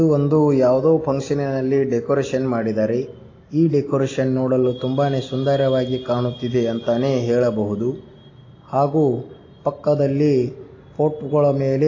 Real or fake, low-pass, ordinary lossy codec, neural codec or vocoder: real; 7.2 kHz; AAC, 32 kbps; none